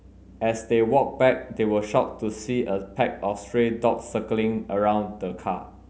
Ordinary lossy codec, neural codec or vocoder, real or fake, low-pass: none; none; real; none